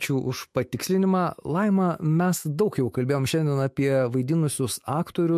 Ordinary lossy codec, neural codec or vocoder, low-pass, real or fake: MP3, 64 kbps; autoencoder, 48 kHz, 128 numbers a frame, DAC-VAE, trained on Japanese speech; 14.4 kHz; fake